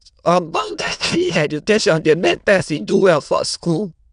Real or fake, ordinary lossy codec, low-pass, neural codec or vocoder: fake; none; 9.9 kHz; autoencoder, 22.05 kHz, a latent of 192 numbers a frame, VITS, trained on many speakers